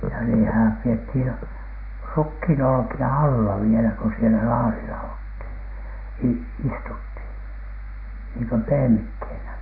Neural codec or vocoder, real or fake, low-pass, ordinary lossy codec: none; real; 5.4 kHz; none